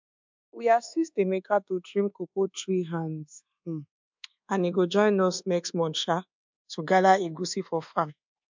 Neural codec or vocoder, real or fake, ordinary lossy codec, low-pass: codec, 24 kHz, 1.2 kbps, DualCodec; fake; MP3, 64 kbps; 7.2 kHz